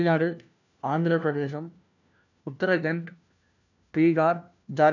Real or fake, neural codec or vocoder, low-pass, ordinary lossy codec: fake; codec, 16 kHz, 1 kbps, FunCodec, trained on LibriTTS, 50 frames a second; 7.2 kHz; none